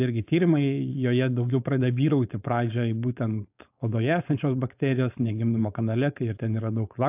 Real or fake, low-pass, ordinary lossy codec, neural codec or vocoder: fake; 3.6 kHz; AAC, 32 kbps; codec, 16 kHz, 4.8 kbps, FACodec